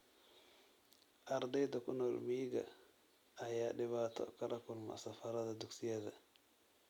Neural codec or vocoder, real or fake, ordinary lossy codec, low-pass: none; real; none; 19.8 kHz